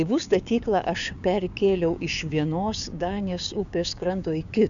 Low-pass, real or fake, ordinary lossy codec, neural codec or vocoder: 7.2 kHz; fake; MP3, 96 kbps; codec, 16 kHz, 6 kbps, DAC